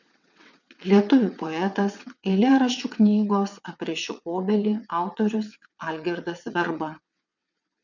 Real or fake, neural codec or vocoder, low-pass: fake; vocoder, 22.05 kHz, 80 mel bands, WaveNeXt; 7.2 kHz